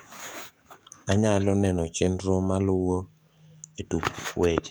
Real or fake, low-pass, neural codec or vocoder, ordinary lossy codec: fake; none; codec, 44.1 kHz, 7.8 kbps, DAC; none